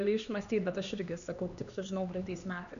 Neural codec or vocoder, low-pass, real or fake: codec, 16 kHz, 2 kbps, X-Codec, HuBERT features, trained on LibriSpeech; 7.2 kHz; fake